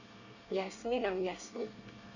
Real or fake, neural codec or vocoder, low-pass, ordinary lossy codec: fake; codec, 24 kHz, 1 kbps, SNAC; 7.2 kHz; none